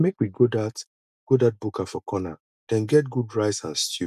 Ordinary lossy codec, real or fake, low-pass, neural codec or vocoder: none; real; 14.4 kHz; none